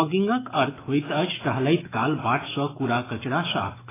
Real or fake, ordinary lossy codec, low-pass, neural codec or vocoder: fake; AAC, 16 kbps; 3.6 kHz; autoencoder, 48 kHz, 128 numbers a frame, DAC-VAE, trained on Japanese speech